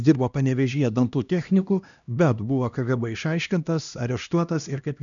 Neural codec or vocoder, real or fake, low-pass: codec, 16 kHz, 1 kbps, X-Codec, HuBERT features, trained on LibriSpeech; fake; 7.2 kHz